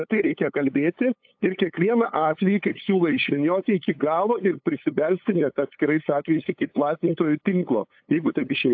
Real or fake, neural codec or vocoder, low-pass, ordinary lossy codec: fake; codec, 16 kHz, 8 kbps, FunCodec, trained on LibriTTS, 25 frames a second; 7.2 kHz; AAC, 48 kbps